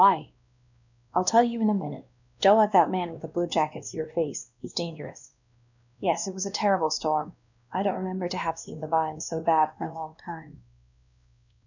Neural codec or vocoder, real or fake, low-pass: codec, 16 kHz, 1 kbps, X-Codec, WavLM features, trained on Multilingual LibriSpeech; fake; 7.2 kHz